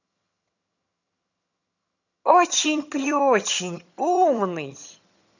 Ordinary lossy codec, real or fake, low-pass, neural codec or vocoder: none; fake; 7.2 kHz; vocoder, 22.05 kHz, 80 mel bands, HiFi-GAN